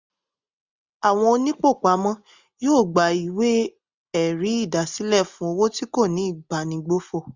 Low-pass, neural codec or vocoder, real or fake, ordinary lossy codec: 7.2 kHz; none; real; Opus, 64 kbps